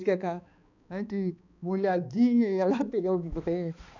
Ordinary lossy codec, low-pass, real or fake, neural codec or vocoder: none; 7.2 kHz; fake; codec, 16 kHz, 2 kbps, X-Codec, HuBERT features, trained on balanced general audio